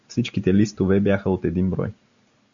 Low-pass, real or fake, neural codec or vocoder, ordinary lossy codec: 7.2 kHz; real; none; MP3, 96 kbps